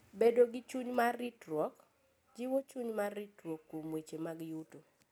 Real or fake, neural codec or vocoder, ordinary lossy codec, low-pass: real; none; none; none